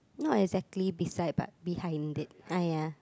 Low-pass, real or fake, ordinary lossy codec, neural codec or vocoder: none; real; none; none